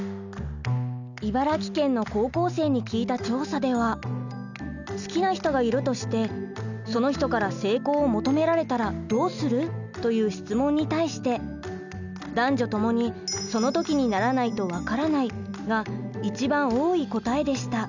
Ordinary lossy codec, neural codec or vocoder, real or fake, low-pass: none; none; real; 7.2 kHz